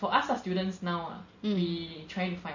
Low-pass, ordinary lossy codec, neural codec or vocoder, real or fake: 7.2 kHz; MP3, 32 kbps; none; real